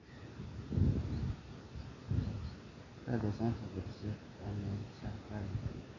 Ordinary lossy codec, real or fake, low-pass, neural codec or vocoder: none; real; 7.2 kHz; none